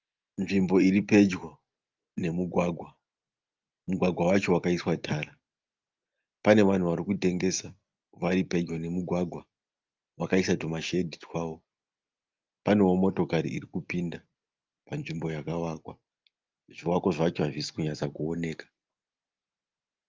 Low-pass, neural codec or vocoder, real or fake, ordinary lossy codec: 7.2 kHz; none; real; Opus, 32 kbps